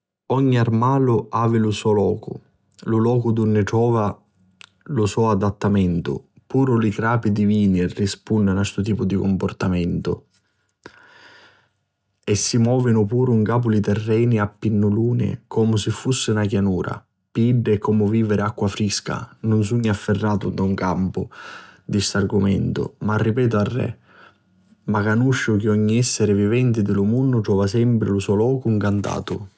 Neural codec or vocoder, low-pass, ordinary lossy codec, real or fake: none; none; none; real